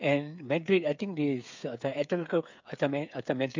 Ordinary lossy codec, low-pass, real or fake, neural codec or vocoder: MP3, 64 kbps; 7.2 kHz; fake; codec, 16 kHz, 8 kbps, FreqCodec, smaller model